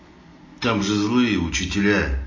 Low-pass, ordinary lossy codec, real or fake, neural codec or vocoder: 7.2 kHz; MP3, 32 kbps; real; none